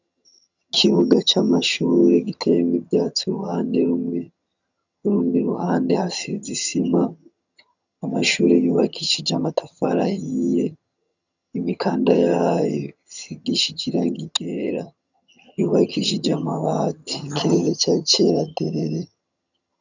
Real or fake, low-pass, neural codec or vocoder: fake; 7.2 kHz; vocoder, 22.05 kHz, 80 mel bands, HiFi-GAN